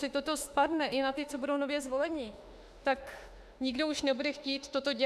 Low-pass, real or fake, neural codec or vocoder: 14.4 kHz; fake; autoencoder, 48 kHz, 32 numbers a frame, DAC-VAE, trained on Japanese speech